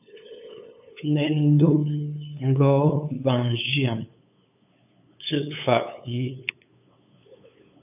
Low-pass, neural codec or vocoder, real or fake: 3.6 kHz; codec, 16 kHz, 8 kbps, FunCodec, trained on LibriTTS, 25 frames a second; fake